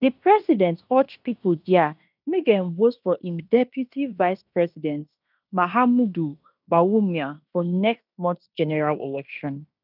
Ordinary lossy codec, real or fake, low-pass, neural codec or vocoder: none; fake; 5.4 kHz; codec, 16 kHz in and 24 kHz out, 0.9 kbps, LongCat-Audio-Codec, fine tuned four codebook decoder